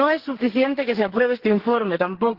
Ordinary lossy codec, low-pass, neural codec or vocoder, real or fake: Opus, 16 kbps; 5.4 kHz; codec, 44.1 kHz, 2.6 kbps, SNAC; fake